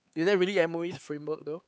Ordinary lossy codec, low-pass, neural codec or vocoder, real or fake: none; none; codec, 16 kHz, 4 kbps, X-Codec, HuBERT features, trained on LibriSpeech; fake